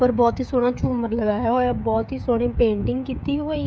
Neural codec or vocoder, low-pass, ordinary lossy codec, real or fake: codec, 16 kHz, 16 kbps, FreqCodec, smaller model; none; none; fake